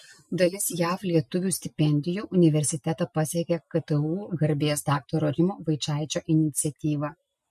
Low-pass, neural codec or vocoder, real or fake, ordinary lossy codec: 14.4 kHz; none; real; MP3, 64 kbps